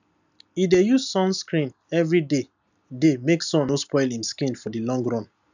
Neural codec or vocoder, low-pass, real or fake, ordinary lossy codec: none; 7.2 kHz; real; none